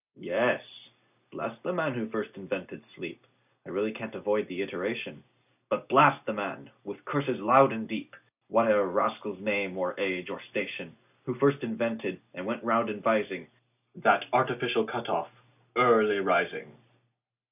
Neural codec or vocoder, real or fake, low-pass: none; real; 3.6 kHz